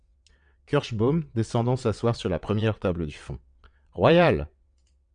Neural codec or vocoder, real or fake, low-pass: vocoder, 22.05 kHz, 80 mel bands, WaveNeXt; fake; 9.9 kHz